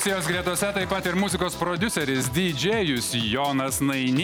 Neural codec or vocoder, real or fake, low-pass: none; real; 19.8 kHz